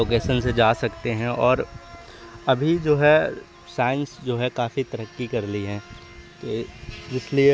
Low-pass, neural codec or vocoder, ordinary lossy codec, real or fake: none; none; none; real